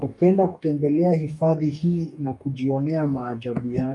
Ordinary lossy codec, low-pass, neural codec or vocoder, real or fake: MP3, 96 kbps; 10.8 kHz; codec, 44.1 kHz, 2.6 kbps, DAC; fake